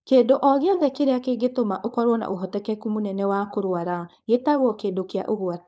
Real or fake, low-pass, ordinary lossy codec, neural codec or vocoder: fake; none; none; codec, 16 kHz, 4.8 kbps, FACodec